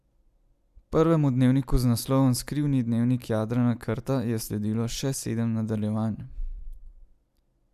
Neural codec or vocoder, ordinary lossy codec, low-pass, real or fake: none; none; 14.4 kHz; real